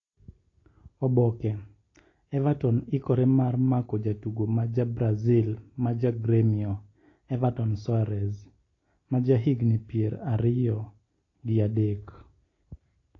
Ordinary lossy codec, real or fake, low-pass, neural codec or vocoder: AAC, 32 kbps; real; 7.2 kHz; none